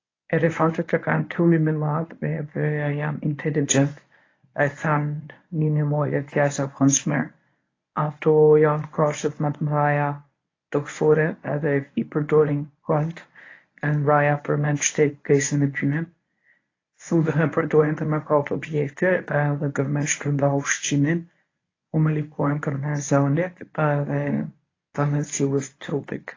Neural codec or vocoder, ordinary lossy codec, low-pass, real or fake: codec, 24 kHz, 0.9 kbps, WavTokenizer, medium speech release version 1; AAC, 32 kbps; 7.2 kHz; fake